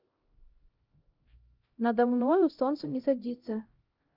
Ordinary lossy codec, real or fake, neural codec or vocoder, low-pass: Opus, 32 kbps; fake; codec, 16 kHz, 0.5 kbps, X-Codec, HuBERT features, trained on LibriSpeech; 5.4 kHz